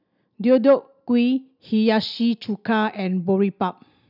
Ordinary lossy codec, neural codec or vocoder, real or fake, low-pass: none; none; real; 5.4 kHz